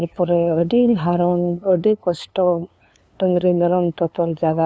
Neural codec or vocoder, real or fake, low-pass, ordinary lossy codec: codec, 16 kHz, 2 kbps, FunCodec, trained on LibriTTS, 25 frames a second; fake; none; none